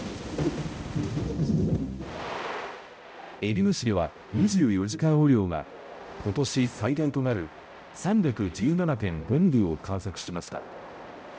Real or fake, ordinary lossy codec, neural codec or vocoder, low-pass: fake; none; codec, 16 kHz, 0.5 kbps, X-Codec, HuBERT features, trained on balanced general audio; none